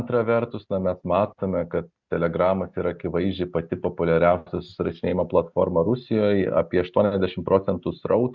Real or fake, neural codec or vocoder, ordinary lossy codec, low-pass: real; none; Opus, 64 kbps; 7.2 kHz